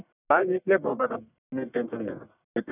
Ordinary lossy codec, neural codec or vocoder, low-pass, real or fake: none; codec, 44.1 kHz, 1.7 kbps, Pupu-Codec; 3.6 kHz; fake